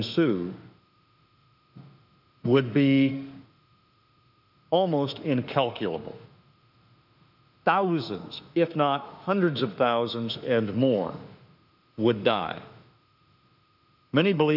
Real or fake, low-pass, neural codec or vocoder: fake; 5.4 kHz; autoencoder, 48 kHz, 32 numbers a frame, DAC-VAE, trained on Japanese speech